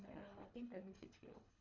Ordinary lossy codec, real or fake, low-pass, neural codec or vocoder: none; fake; 7.2 kHz; codec, 24 kHz, 3 kbps, HILCodec